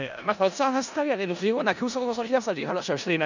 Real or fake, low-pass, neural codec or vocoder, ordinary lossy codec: fake; 7.2 kHz; codec, 16 kHz in and 24 kHz out, 0.4 kbps, LongCat-Audio-Codec, four codebook decoder; none